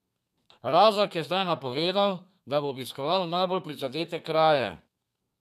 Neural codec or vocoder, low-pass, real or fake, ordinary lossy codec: codec, 32 kHz, 1.9 kbps, SNAC; 14.4 kHz; fake; none